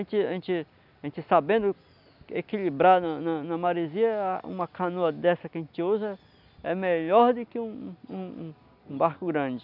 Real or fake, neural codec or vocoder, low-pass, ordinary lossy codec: real; none; 5.4 kHz; none